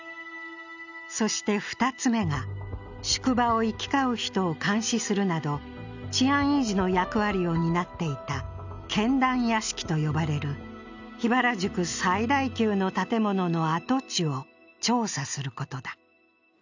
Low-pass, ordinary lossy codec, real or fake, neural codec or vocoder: 7.2 kHz; none; real; none